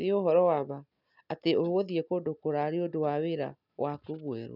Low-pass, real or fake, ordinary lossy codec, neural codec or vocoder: 5.4 kHz; real; none; none